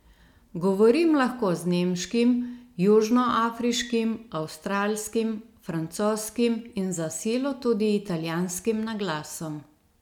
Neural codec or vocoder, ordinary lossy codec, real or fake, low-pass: none; none; real; 19.8 kHz